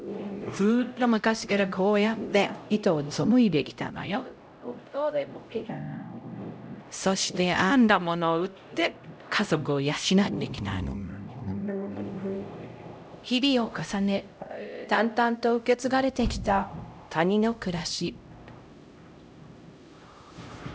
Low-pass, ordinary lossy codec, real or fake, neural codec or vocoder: none; none; fake; codec, 16 kHz, 0.5 kbps, X-Codec, HuBERT features, trained on LibriSpeech